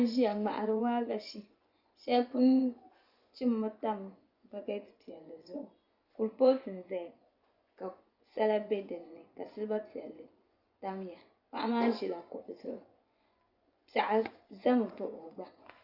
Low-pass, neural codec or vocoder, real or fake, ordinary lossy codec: 5.4 kHz; vocoder, 24 kHz, 100 mel bands, Vocos; fake; Opus, 64 kbps